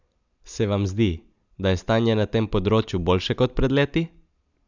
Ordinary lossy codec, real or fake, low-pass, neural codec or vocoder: none; real; 7.2 kHz; none